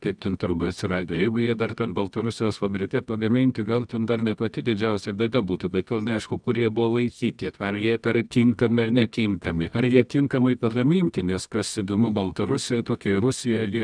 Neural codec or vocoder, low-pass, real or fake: codec, 24 kHz, 0.9 kbps, WavTokenizer, medium music audio release; 9.9 kHz; fake